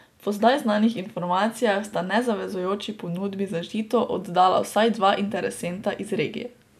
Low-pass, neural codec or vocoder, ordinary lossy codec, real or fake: 14.4 kHz; none; none; real